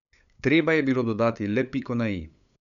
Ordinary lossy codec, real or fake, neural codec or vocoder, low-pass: none; fake; codec, 16 kHz, 8 kbps, FunCodec, trained on LibriTTS, 25 frames a second; 7.2 kHz